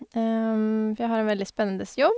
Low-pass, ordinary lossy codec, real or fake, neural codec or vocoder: none; none; real; none